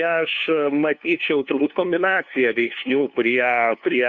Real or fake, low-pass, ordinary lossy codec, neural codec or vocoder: fake; 7.2 kHz; Opus, 64 kbps; codec, 16 kHz, 2 kbps, FunCodec, trained on LibriTTS, 25 frames a second